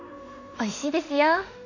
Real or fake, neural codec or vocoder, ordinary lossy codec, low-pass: fake; autoencoder, 48 kHz, 32 numbers a frame, DAC-VAE, trained on Japanese speech; none; 7.2 kHz